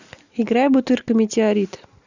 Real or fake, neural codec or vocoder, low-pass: real; none; 7.2 kHz